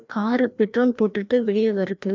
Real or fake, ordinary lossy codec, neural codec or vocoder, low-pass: fake; MP3, 64 kbps; codec, 16 kHz, 1 kbps, FreqCodec, larger model; 7.2 kHz